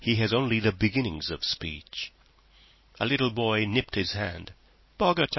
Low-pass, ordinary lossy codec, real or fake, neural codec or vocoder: 7.2 kHz; MP3, 24 kbps; real; none